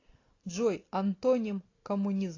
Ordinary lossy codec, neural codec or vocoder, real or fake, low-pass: AAC, 32 kbps; none; real; 7.2 kHz